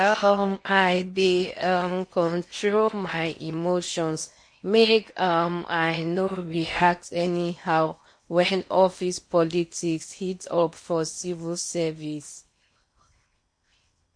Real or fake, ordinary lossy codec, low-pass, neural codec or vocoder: fake; MP3, 48 kbps; 9.9 kHz; codec, 16 kHz in and 24 kHz out, 0.8 kbps, FocalCodec, streaming, 65536 codes